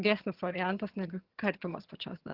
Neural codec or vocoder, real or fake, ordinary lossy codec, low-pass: vocoder, 22.05 kHz, 80 mel bands, HiFi-GAN; fake; Opus, 16 kbps; 5.4 kHz